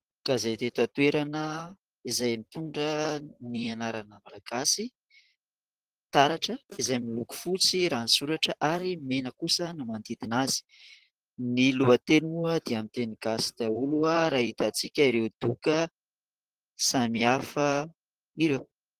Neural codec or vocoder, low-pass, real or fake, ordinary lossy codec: vocoder, 44.1 kHz, 128 mel bands, Pupu-Vocoder; 14.4 kHz; fake; Opus, 16 kbps